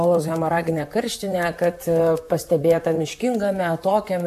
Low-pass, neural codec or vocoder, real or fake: 14.4 kHz; vocoder, 44.1 kHz, 128 mel bands, Pupu-Vocoder; fake